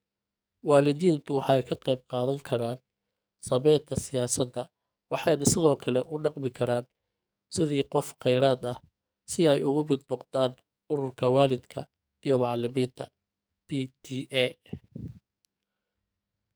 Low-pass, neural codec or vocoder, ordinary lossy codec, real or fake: none; codec, 44.1 kHz, 2.6 kbps, SNAC; none; fake